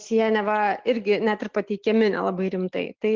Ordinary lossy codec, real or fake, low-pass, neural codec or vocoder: Opus, 24 kbps; real; 7.2 kHz; none